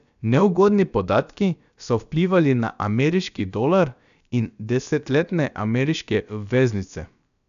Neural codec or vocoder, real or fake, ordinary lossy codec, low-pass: codec, 16 kHz, about 1 kbps, DyCAST, with the encoder's durations; fake; none; 7.2 kHz